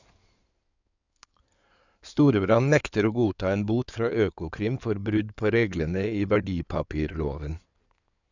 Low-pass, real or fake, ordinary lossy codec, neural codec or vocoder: 7.2 kHz; fake; none; codec, 16 kHz in and 24 kHz out, 2.2 kbps, FireRedTTS-2 codec